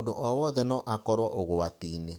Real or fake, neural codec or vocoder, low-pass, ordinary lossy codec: fake; codec, 44.1 kHz, 7.8 kbps, DAC; 19.8 kHz; none